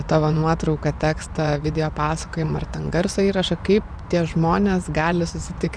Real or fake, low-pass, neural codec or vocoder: fake; 9.9 kHz; vocoder, 24 kHz, 100 mel bands, Vocos